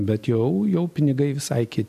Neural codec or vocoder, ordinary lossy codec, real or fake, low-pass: none; MP3, 64 kbps; real; 14.4 kHz